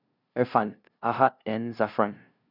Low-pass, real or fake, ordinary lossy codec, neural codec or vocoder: 5.4 kHz; fake; none; codec, 16 kHz, 0.5 kbps, FunCodec, trained on LibriTTS, 25 frames a second